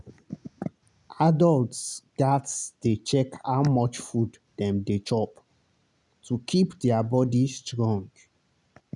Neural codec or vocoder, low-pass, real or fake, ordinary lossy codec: none; 10.8 kHz; real; none